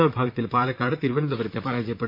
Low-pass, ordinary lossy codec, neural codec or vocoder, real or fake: 5.4 kHz; MP3, 48 kbps; vocoder, 44.1 kHz, 128 mel bands, Pupu-Vocoder; fake